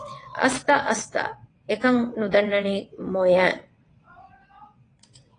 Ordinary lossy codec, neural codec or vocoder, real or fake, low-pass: AAC, 32 kbps; vocoder, 22.05 kHz, 80 mel bands, WaveNeXt; fake; 9.9 kHz